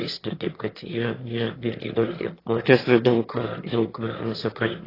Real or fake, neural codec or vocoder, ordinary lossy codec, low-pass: fake; autoencoder, 22.05 kHz, a latent of 192 numbers a frame, VITS, trained on one speaker; AAC, 24 kbps; 5.4 kHz